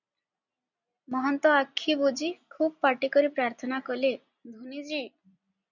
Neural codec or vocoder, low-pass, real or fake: none; 7.2 kHz; real